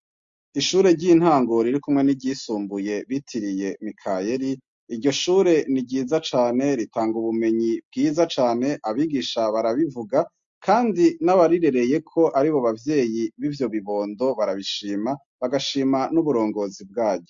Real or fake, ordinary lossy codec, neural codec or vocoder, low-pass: real; MP3, 48 kbps; none; 7.2 kHz